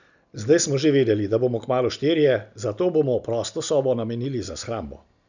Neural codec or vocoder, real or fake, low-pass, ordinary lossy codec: none; real; 7.2 kHz; none